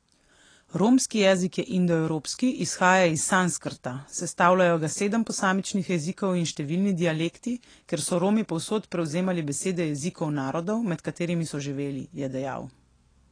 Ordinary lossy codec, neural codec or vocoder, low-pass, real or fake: AAC, 32 kbps; none; 9.9 kHz; real